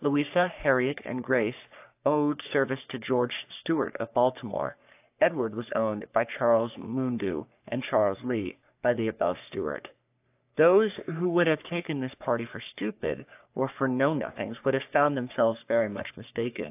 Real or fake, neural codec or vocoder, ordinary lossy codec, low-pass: fake; codec, 44.1 kHz, 3.4 kbps, Pupu-Codec; AAC, 32 kbps; 3.6 kHz